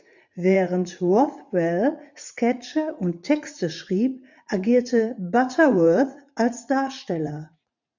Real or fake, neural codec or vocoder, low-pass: fake; vocoder, 44.1 kHz, 80 mel bands, Vocos; 7.2 kHz